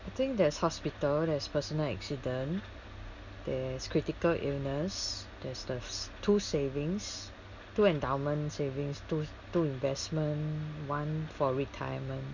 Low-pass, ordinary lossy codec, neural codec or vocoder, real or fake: 7.2 kHz; none; none; real